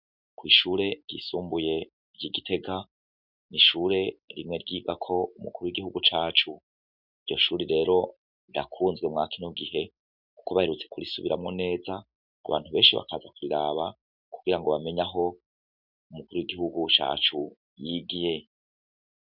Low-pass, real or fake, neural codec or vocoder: 5.4 kHz; real; none